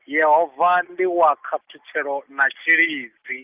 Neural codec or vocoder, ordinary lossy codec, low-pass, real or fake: none; Opus, 24 kbps; 3.6 kHz; real